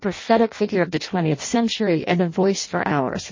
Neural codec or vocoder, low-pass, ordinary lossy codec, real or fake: codec, 16 kHz in and 24 kHz out, 0.6 kbps, FireRedTTS-2 codec; 7.2 kHz; MP3, 32 kbps; fake